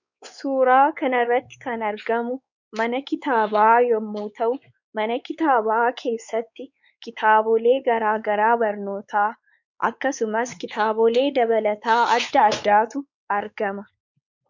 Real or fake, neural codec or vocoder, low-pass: fake; codec, 16 kHz, 4 kbps, X-Codec, WavLM features, trained on Multilingual LibriSpeech; 7.2 kHz